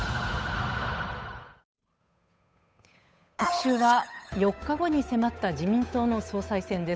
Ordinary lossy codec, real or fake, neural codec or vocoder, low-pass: none; fake; codec, 16 kHz, 8 kbps, FunCodec, trained on Chinese and English, 25 frames a second; none